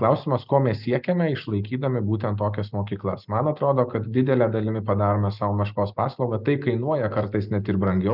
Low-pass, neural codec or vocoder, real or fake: 5.4 kHz; none; real